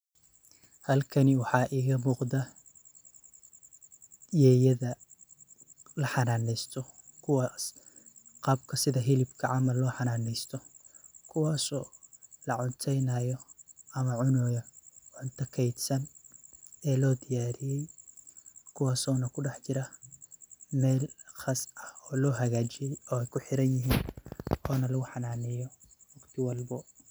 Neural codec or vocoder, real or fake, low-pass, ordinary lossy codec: none; real; none; none